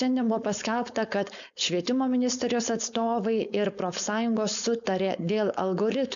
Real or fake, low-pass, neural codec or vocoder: fake; 7.2 kHz; codec, 16 kHz, 4.8 kbps, FACodec